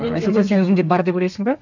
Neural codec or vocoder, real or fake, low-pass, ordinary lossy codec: codec, 32 kHz, 1.9 kbps, SNAC; fake; 7.2 kHz; none